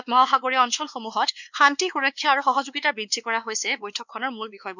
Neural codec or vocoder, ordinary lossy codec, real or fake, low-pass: codec, 16 kHz, 2 kbps, X-Codec, WavLM features, trained on Multilingual LibriSpeech; none; fake; 7.2 kHz